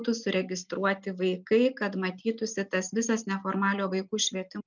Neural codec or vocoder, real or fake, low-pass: none; real; 7.2 kHz